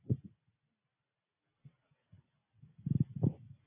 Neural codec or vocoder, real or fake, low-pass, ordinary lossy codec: none; real; 3.6 kHz; AAC, 16 kbps